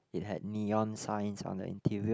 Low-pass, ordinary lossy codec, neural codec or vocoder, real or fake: none; none; none; real